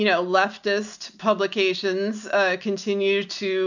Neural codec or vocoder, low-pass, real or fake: none; 7.2 kHz; real